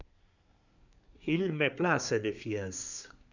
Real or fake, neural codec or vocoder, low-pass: fake; codec, 24 kHz, 1 kbps, SNAC; 7.2 kHz